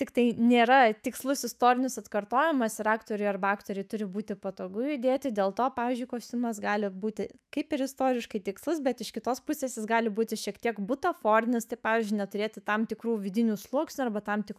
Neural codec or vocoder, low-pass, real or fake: autoencoder, 48 kHz, 128 numbers a frame, DAC-VAE, trained on Japanese speech; 14.4 kHz; fake